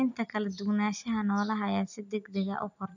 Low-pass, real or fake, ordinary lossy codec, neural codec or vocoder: 7.2 kHz; real; none; none